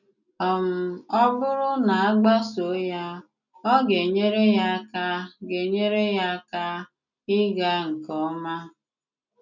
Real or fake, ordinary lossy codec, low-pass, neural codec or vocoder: real; none; 7.2 kHz; none